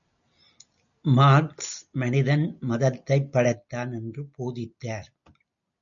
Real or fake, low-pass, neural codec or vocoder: real; 7.2 kHz; none